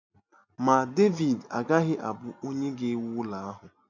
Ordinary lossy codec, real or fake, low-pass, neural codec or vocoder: none; real; 7.2 kHz; none